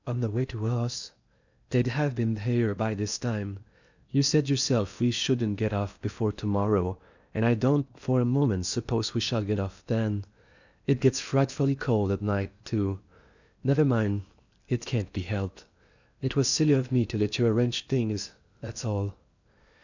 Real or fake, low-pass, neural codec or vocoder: fake; 7.2 kHz; codec, 16 kHz in and 24 kHz out, 0.8 kbps, FocalCodec, streaming, 65536 codes